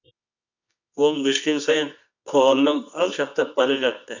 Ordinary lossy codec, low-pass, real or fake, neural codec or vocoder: none; 7.2 kHz; fake; codec, 24 kHz, 0.9 kbps, WavTokenizer, medium music audio release